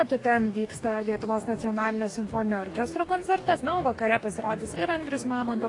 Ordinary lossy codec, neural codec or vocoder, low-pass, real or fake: AAC, 48 kbps; codec, 44.1 kHz, 2.6 kbps, DAC; 10.8 kHz; fake